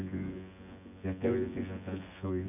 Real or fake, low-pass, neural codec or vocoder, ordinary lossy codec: fake; 3.6 kHz; vocoder, 24 kHz, 100 mel bands, Vocos; none